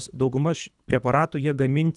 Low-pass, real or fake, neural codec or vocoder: 10.8 kHz; fake; codec, 24 kHz, 3 kbps, HILCodec